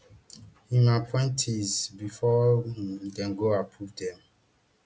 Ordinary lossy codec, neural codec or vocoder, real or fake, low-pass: none; none; real; none